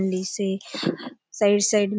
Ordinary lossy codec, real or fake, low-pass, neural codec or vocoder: none; real; none; none